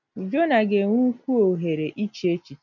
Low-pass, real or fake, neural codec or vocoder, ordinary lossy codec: 7.2 kHz; real; none; none